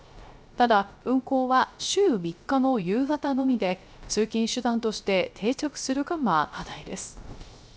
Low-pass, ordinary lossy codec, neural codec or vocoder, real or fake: none; none; codec, 16 kHz, 0.3 kbps, FocalCodec; fake